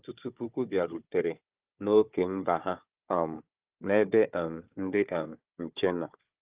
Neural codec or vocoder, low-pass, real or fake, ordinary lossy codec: codec, 16 kHz, 4 kbps, FunCodec, trained on Chinese and English, 50 frames a second; 3.6 kHz; fake; Opus, 32 kbps